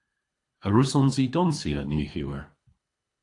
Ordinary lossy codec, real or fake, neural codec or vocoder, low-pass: AAC, 48 kbps; fake; codec, 24 kHz, 3 kbps, HILCodec; 10.8 kHz